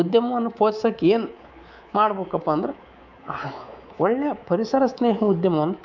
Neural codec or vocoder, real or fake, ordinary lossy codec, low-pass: codec, 24 kHz, 3.1 kbps, DualCodec; fake; none; 7.2 kHz